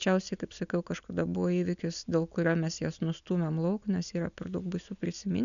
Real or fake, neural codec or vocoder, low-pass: real; none; 7.2 kHz